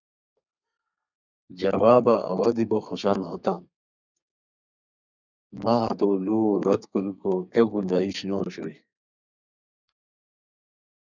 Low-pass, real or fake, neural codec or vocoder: 7.2 kHz; fake; codec, 32 kHz, 1.9 kbps, SNAC